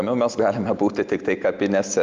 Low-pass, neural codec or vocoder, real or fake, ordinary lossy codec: 7.2 kHz; none; real; Opus, 24 kbps